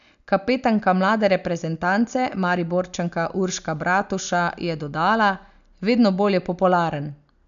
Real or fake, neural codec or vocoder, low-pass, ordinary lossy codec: real; none; 7.2 kHz; none